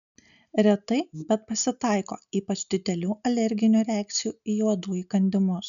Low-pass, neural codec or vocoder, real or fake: 7.2 kHz; none; real